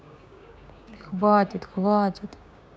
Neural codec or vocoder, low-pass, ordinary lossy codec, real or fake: codec, 16 kHz, 6 kbps, DAC; none; none; fake